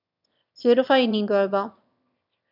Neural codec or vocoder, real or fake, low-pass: autoencoder, 22.05 kHz, a latent of 192 numbers a frame, VITS, trained on one speaker; fake; 5.4 kHz